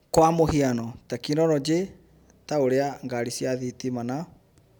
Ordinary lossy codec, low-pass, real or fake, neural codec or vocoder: none; none; real; none